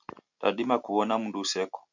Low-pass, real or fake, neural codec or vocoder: 7.2 kHz; real; none